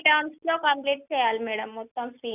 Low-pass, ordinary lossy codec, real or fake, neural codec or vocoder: 3.6 kHz; none; real; none